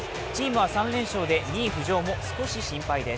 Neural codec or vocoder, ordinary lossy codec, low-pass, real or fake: none; none; none; real